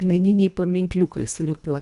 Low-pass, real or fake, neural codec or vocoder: 10.8 kHz; fake; codec, 24 kHz, 1.5 kbps, HILCodec